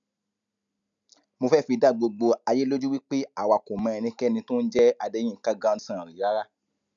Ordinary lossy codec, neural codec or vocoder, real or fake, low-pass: none; none; real; 7.2 kHz